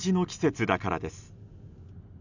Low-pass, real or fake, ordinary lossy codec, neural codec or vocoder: 7.2 kHz; real; none; none